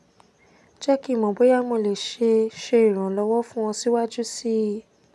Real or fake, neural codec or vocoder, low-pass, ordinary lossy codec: real; none; none; none